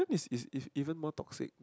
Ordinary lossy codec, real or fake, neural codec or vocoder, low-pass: none; real; none; none